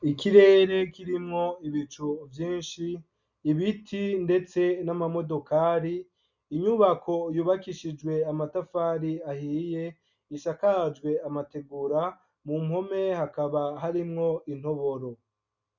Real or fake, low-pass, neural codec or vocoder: real; 7.2 kHz; none